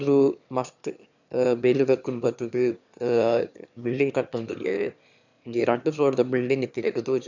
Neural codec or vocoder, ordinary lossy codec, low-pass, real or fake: autoencoder, 22.05 kHz, a latent of 192 numbers a frame, VITS, trained on one speaker; none; 7.2 kHz; fake